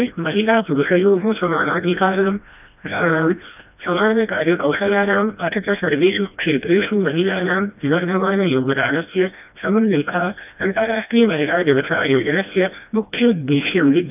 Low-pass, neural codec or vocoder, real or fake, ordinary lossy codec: 3.6 kHz; codec, 16 kHz, 1 kbps, FreqCodec, smaller model; fake; none